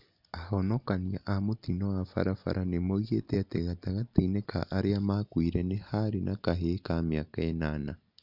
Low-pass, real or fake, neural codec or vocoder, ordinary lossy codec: 5.4 kHz; real; none; none